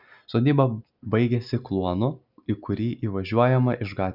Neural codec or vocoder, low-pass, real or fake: none; 5.4 kHz; real